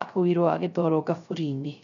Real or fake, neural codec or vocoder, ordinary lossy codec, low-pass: fake; codec, 16 kHz, 0.3 kbps, FocalCodec; none; 7.2 kHz